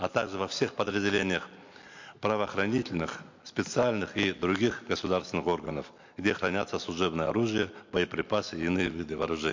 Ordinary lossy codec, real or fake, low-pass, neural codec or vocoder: MP3, 48 kbps; real; 7.2 kHz; none